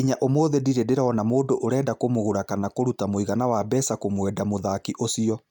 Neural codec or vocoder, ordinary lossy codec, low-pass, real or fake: none; none; 19.8 kHz; real